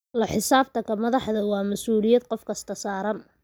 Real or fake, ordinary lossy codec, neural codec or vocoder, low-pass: real; none; none; none